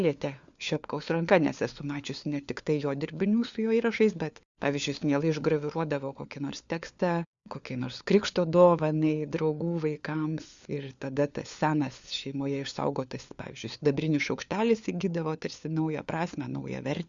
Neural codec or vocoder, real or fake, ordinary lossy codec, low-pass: codec, 16 kHz, 4 kbps, FunCodec, trained on LibriTTS, 50 frames a second; fake; Opus, 64 kbps; 7.2 kHz